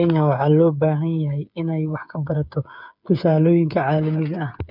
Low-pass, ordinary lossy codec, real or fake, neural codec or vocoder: 5.4 kHz; none; fake; codec, 16 kHz, 8 kbps, FreqCodec, smaller model